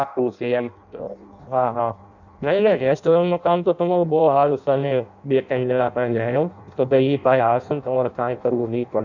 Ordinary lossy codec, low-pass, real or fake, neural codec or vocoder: none; 7.2 kHz; fake; codec, 16 kHz in and 24 kHz out, 0.6 kbps, FireRedTTS-2 codec